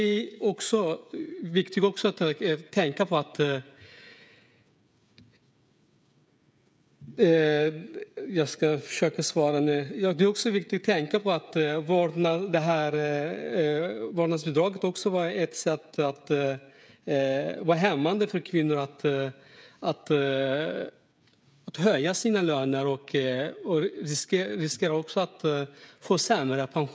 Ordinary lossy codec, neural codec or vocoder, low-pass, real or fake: none; codec, 16 kHz, 16 kbps, FreqCodec, smaller model; none; fake